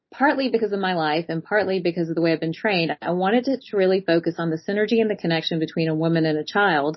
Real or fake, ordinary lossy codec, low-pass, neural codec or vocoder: real; MP3, 24 kbps; 7.2 kHz; none